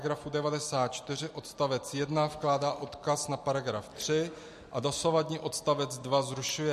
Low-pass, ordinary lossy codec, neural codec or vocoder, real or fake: 14.4 kHz; MP3, 64 kbps; none; real